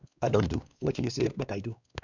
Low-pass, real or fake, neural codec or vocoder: 7.2 kHz; fake; codec, 16 kHz, 2 kbps, X-Codec, WavLM features, trained on Multilingual LibriSpeech